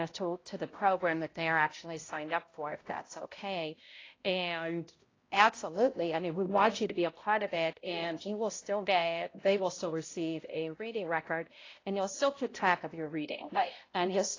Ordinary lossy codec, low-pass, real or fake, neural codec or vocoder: AAC, 32 kbps; 7.2 kHz; fake; codec, 16 kHz, 0.5 kbps, X-Codec, HuBERT features, trained on balanced general audio